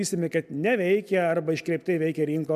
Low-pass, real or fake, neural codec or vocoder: 14.4 kHz; real; none